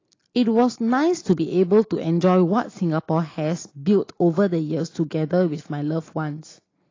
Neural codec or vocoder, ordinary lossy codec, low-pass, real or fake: none; AAC, 32 kbps; 7.2 kHz; real